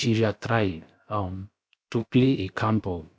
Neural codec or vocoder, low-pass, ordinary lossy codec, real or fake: codec, 16 kHz, about 1 kbps, DyCAST, with the encoder's durations; none; none; fake